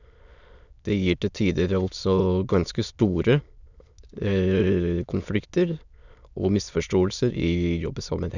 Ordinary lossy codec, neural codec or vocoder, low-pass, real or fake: none; autoencoder, 22.05 kHz, a latent of 192 numbers a frame, VITS, trained on many speakers; 7.2 kHz; fake